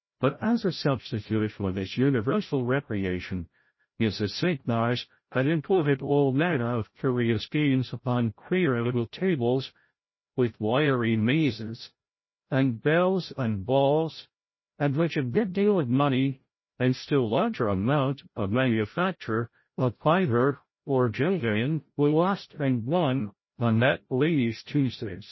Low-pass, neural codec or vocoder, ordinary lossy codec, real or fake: 7.2 kHz; codec, 16 kHz, 0.5 kbps, FreqCodec, larger model; MP3, 24 kbps; fake